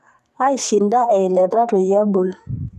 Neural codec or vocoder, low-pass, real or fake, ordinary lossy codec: codec, 44.1 kHz, 2.6 kbps, SNAC; 14.4 kHz; fake; none